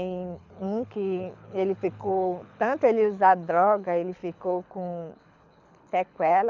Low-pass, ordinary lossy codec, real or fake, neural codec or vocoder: 7.2 kHz; none; fake; codec, 24 kHz, 6 kbps, HILCodec